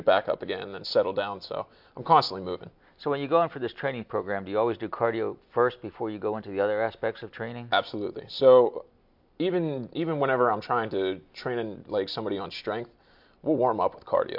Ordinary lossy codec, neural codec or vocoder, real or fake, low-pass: MP3, 48 kbps; none; real; 5.4 kHz